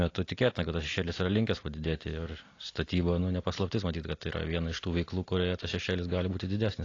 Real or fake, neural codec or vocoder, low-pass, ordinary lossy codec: real; none; 7.2 kHz; AAC, 32 kbps